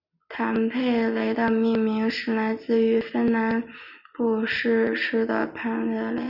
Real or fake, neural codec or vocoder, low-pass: real; none; 5.4 kHz